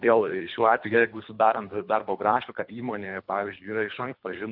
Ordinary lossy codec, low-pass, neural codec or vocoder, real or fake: MP3, 48 kbps; 5.4 kHz; codec, 24 kHz, 3 kbps, HILCodec; fake